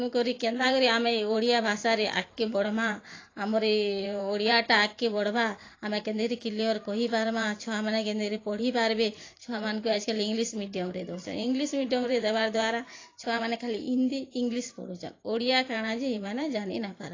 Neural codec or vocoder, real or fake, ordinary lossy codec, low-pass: vocoder, 44.1 kHz, 128 mel bands every 512 samples, BigVGAN v2; fake; AAC, 32 kbps; 7.2 kHz